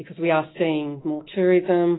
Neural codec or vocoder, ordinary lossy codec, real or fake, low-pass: autoencoder, 48 kHz, 128 numbers a frame, DAC-VAE, trained on Japanese speech; AAC, 16 kbps; fake; 7.2 kHz